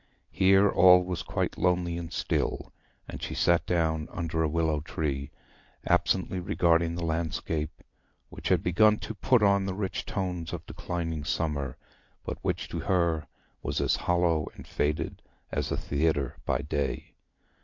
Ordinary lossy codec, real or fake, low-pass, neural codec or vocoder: MP3, 48 kbps; real; 7.2 kHz; none